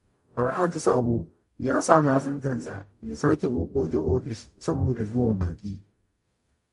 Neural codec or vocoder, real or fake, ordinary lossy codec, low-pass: codec, 44.1 kHz, 0.9 kbps, DAC; fake; MP3, 48 kbps; 14.4 kHz